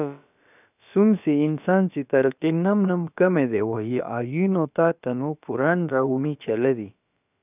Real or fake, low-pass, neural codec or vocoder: fake; 3.6 kHz; codec, 16 kHz, about 1 kbps, DyCAST, with the encoder's durations